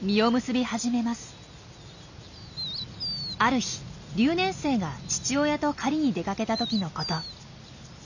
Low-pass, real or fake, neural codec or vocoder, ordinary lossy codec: 7.2 kHz; real; none; none